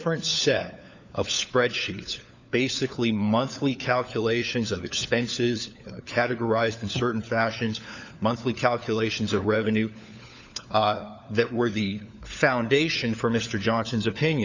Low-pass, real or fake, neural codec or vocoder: 7.2 kHz; fake; codec, 16 kHz, 4 kbps, FunCodec, trained on LibriTTS, 50 frames a second